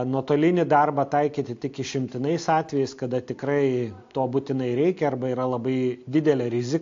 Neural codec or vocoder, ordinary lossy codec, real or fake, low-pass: none; AAC, 48 kbps; real; 7.2 kHz